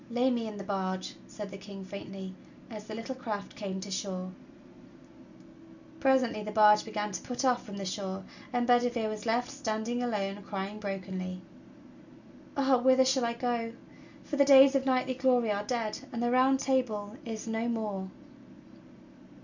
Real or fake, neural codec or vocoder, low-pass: real; none; 7.2 kHz